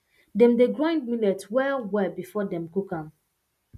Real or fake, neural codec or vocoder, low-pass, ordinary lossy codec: real; none; 14.4 kHz; none